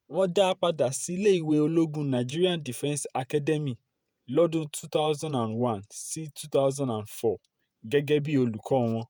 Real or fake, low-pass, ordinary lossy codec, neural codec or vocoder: fake; none; none; vocoder, 48 kHz, 128 mel bands, Vocos